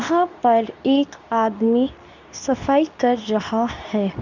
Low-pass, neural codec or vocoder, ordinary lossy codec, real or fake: 7.2 kHz; codec, 24 kHz, 0.9 kbps, WavTokenizer, medium speech release version 2; none; fake